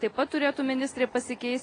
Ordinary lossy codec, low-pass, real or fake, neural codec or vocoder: AAC, 32 kbps; 9.9 kHz; real; none